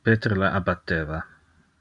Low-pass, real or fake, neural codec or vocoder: 10.8 kHz; real; none